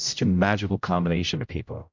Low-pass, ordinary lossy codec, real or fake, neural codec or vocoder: 7.2 kHz; MP3, 64 kbps; fake; codec, 16 kHz, 0.5 kbps, X-Codec, HuBERT features, trained on general audio